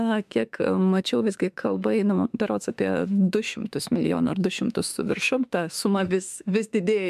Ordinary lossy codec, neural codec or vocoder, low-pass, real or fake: MP3, 96 kbps; autoencoder, 48 kHz, 32 numbers a frame, DAC-VAE, trained on Japanese speech; 14.4 kHz; fake